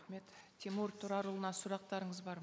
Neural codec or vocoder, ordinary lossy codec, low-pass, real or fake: none; none; none; real